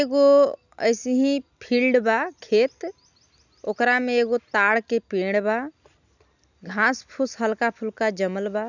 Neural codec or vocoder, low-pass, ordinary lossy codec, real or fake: none; 7.2 kHz; none; real